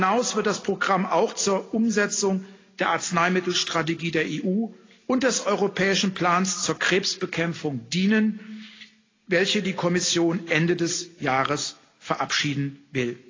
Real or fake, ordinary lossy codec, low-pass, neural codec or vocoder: real; AAC, 32 kbps; 7.2 kHz; none